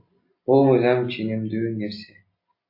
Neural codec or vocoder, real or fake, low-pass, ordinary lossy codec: none; real; 5.4 kHz; MP3, 32 kbps